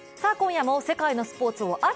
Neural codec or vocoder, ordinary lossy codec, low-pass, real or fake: none; none; none; real